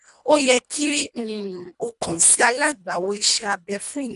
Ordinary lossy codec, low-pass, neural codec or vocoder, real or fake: none; 10.8 kHz; codec, 24 kHz, 1.5 kbps, HILCodec; fake